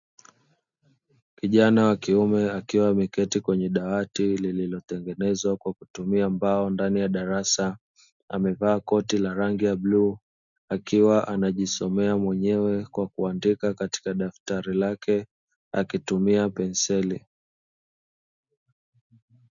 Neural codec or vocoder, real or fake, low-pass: none; real; 7.2 kHz